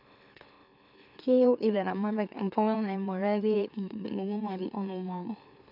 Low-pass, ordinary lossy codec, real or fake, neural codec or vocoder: 5.4 kHz; none; fake; autoencoder, 44.1 kHz, a latent of 192 numbers a frame, MeloTTS